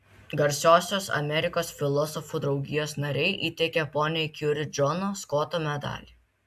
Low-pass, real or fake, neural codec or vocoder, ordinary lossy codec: 14.4 kHz; real; none; AAC, 96 kbps